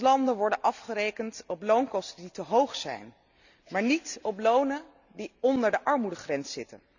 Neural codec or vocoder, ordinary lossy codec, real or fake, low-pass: none; none; real; 7.2 kHz